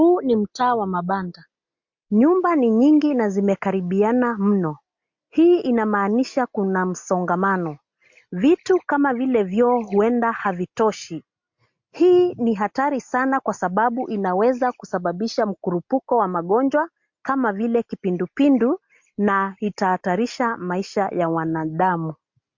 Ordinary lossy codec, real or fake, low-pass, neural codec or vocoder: MP3, 48 kbps; real; 7.2 kHz; none